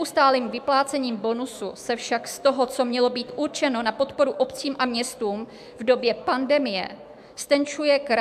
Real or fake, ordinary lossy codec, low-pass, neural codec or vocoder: fake; AAC, 96 kbps; 14.4 kHz; autoencoder, 48 kHz, 128 numbers a frame, DAC-VAE, trained on Japanese speech